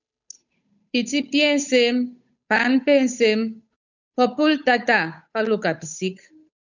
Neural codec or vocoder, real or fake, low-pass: codec, 16 kHz, 8 kbps, FunCodec, trained on Chinese and English, 25 frames a second; fake; 7.2 kHz